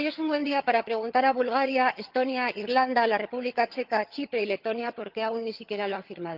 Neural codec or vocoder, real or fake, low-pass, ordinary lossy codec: vocoder, 22.05 kHz, 80 mel bands, HiFi-GAN; fake; 5.4 kHz; Opus, 32 kbps